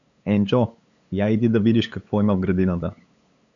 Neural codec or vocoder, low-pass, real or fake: codec, 16 kHz, 8 kbps, FunCodec, trained on LibriTTS, 25 frames a second; 7.2 kHz; fake